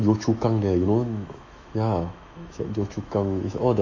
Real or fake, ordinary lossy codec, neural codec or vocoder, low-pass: fake; AAC, 48 kbps; autoencoder, 48 kHz, 128 numbers a frame, DAC-VAE, trained on Japanese speech; 7.2 kHz